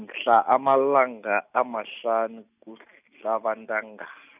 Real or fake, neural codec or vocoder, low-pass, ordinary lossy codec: real; none; 3.6 kHz; none